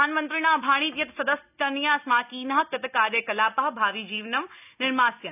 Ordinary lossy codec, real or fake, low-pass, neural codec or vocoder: none; real; 3.6 kHz; none